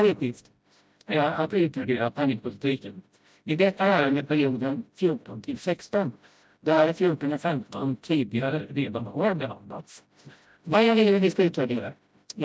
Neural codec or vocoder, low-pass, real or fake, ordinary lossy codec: codec, 16 kHz, 0.5 kbps, FreqCodec, smaller model; none; fake; none